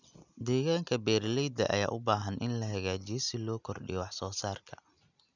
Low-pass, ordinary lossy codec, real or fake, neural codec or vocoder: 7.2 kHz; none; real; none